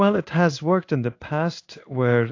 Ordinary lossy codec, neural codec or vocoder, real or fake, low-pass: AAC, 48 kbps; codec, 16 kHz in and 24 kHz out, 1 kbps, XY-Tokenizer; fake; 7.2 kHz